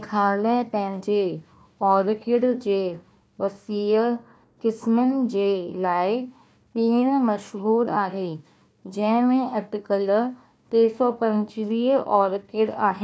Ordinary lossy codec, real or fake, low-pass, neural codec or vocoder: none; fake; none; codec, 16 kHz, 1 kbps, FunCodec, trained on Chinese and English, 50 frames a second